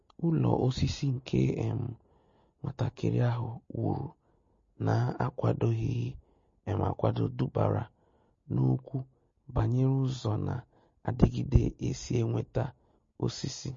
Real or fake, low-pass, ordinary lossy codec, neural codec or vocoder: real; 7.2 kHz; MP3, 32 kbps; none